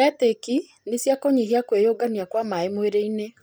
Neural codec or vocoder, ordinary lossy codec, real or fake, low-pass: none; none; real; none